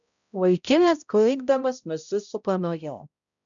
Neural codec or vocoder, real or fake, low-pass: codec, 16 kHz, 0.5 kbps, X-Codec, HuBERT features, trained on balanced general audio; fake; 7.2 kHz